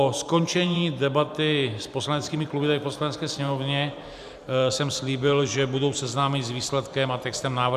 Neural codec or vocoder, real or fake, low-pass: vocoder, 44.1 kHz, 128 mel bands every 512 samples, BigVGAN v2; fake; 14.4 kHz